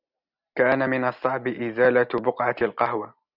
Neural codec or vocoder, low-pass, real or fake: none; 5.4 kHz; real